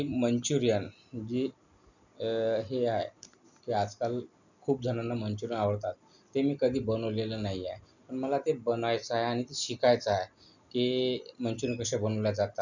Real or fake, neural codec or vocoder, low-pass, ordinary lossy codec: real; none; 7.2 kHz; none